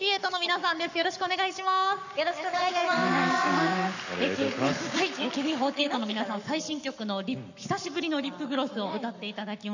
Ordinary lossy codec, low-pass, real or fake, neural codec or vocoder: none; 7.2 kHz; fake; codec, 44.1 kHz, 7.8 kbps, Pupu-Codec